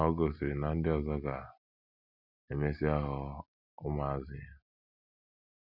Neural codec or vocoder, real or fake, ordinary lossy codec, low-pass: none; real; none; 5.4 kHz